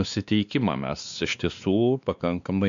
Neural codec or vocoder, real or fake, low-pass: codec, 16 kHz, 2 kbps, X-Codec, WavLM features, trained on Multilingual LibriSpeech; fake; 7.2 kHz